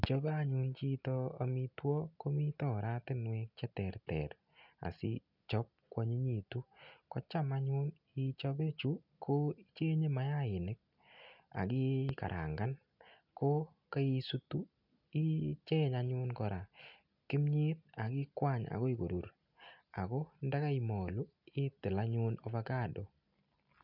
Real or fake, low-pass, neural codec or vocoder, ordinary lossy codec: real; 5.4 kHz; none; none